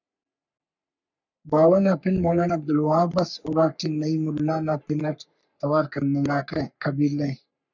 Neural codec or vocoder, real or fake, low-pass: codec, 44.1 kHz, 3.4 kbps, Pupu-Codec; fake; 7.2 kHz